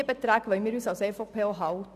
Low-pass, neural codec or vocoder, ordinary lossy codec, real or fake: 14.4 kHz; none; none; real